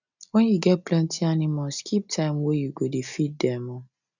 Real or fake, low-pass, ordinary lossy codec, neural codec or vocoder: real; 7.2 kHz; none; none